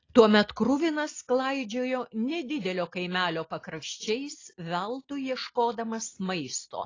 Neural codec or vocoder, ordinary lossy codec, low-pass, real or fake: none; AAC, 32 kbps; 7.2 kHz; real